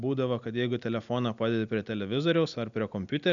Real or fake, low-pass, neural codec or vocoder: real; 7.2 kHz; none